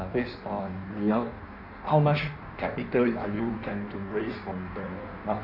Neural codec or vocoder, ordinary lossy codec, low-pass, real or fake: codec, 16 kHz in and 24 kHz out, 1.1 kbps, FireRedTTS-2 codec; none; 5.4 kHz; fake